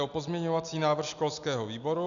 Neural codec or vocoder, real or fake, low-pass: none; real; 7.2 kHz